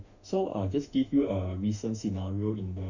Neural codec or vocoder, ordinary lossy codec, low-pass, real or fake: autoencoder, 48 kHz, 32 numbers a frame, DAC-VAE, trained on Japanese speech; none; 7.2 kHz; fake